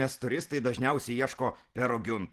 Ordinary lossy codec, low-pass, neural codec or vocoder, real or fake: Opus, 16 kbps; 14.4 kHz; vocoder, 44.1 kHz, 128 mel bands every 512 samples, BigVGAN v2; fake